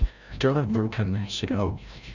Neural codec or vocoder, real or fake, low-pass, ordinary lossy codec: codec, 16 kHz, 0.5 kbps, FreqCodec, larger model; fake; 7.2 kHz; none